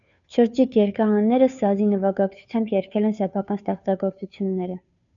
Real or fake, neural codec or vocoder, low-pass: fake; codec, 16 kHz, 2 kbps, FunCodec, trained on Chinese and English, 25 frames a second; 7.2 kHz